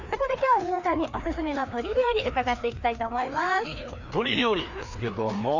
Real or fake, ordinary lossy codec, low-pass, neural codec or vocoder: fake; none; 7.2 kHz; codec, 16 kHz, 2 kbps, FreqCodec, larger model